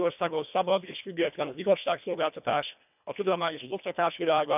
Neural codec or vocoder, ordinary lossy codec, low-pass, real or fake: codec, 24 kHz, 1.5 kbps, HILCodec; none; 3.6 kHz; fake